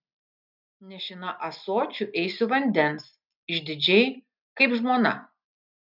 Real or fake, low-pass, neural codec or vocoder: real; 5.4 kHz; none